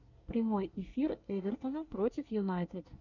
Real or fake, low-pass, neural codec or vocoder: fake; 7.2 kHz; codec, 24 kHz, 1 kbps, SNAC